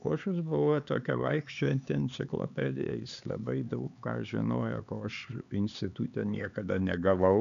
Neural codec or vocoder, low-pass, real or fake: codec, 16 kHz, 4 kbps, X-Codec, HuBERT features, trained on LibriSpeech; 7.2 kHz; fake